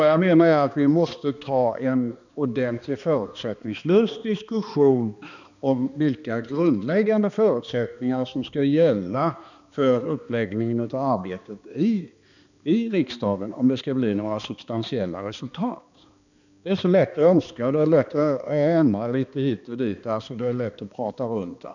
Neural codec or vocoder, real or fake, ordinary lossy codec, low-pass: codec, 16 kHz, 2 kbps, X-Codec, HuBERT features, trained on balanced general audio; fake; none; 7.2 kHz